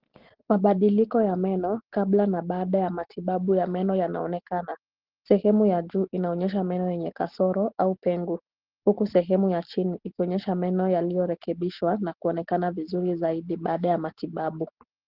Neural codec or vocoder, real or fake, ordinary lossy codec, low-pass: none; real; Opus, 16 kbps; 5.4 kHz